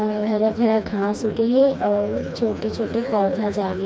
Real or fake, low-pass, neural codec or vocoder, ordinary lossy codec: fake; none; codec, 16 kHz, 2 kbps, FreqCodec, smaller model; none